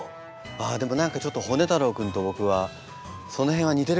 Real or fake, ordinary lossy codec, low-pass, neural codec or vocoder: real; none; none; none